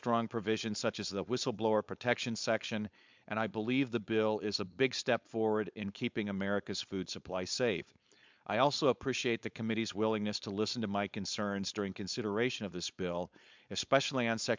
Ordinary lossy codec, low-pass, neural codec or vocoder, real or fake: MP3, 64 kbps; 7.2 kHz; codec, 16 kHz, 4.8 kbps, FACodec; fake